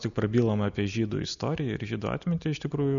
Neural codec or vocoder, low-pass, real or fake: none; 7.2 kHz; real